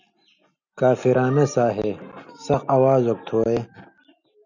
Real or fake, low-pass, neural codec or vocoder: real; 7.2 kHz; none